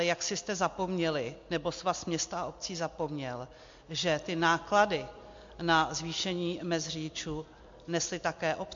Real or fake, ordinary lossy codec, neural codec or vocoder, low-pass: real; MP3, 48 kbps; none; 7.2 kHz